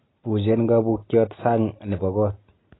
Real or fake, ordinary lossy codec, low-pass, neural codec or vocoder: real; AAC, 16 kbps; 7.2 kHz; none